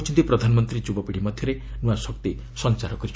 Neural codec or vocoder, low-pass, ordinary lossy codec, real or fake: none; none; none; real